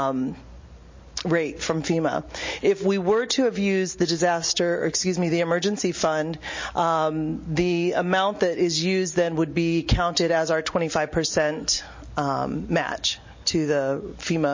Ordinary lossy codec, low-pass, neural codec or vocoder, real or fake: MP3, 32 kbps; 7.2 kHz; none; real